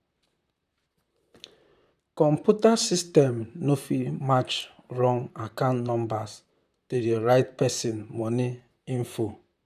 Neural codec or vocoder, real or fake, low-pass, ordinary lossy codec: vocoder, 44.1 kHz, 128 mel bands, Pupu-Vocoder; fake; 14.4 kHz; none